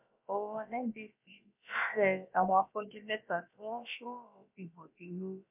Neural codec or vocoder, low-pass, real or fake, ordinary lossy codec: codec, 16 kHz, about 1 kbps, DyCAST, with the encoder's durations; 3.6 kHz; fake; MP3, 32 kbps